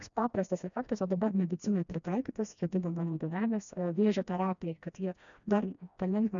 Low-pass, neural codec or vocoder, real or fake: 7.2 kHz; codec, 16 kHz, 1 kbps, FreqCodec, smaller model; fake